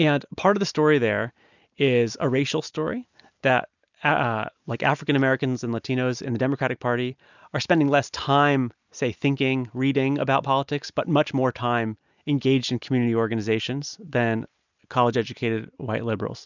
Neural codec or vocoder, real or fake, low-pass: none; real; 7.2 kHz